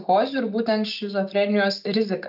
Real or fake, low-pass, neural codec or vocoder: real; 5.4 kHz; none